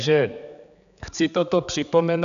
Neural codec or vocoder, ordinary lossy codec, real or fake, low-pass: codec, 16 kHz, 4 kbps, X-Codec, HuBERT features, trained on general audio; MP3, 96 kbps; fake; 7.2 kHz